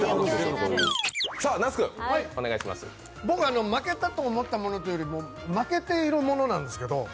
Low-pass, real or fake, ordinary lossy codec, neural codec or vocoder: none; real; none; none